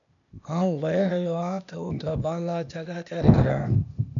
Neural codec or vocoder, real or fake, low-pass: codec, 16 kHz, 0.8 kbps, ZipCodec; fake; 7.2 kHz